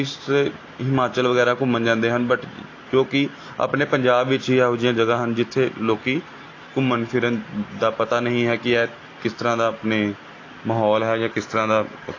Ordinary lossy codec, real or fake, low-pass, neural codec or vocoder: AAC, 32 kbps; real; 7.2 kHz; none